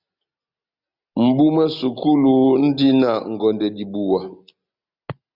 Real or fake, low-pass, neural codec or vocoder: real; 5.4 kHz; none